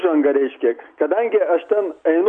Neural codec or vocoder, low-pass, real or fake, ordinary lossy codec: none; 9.9 kHz; real; MP3, 96 kbps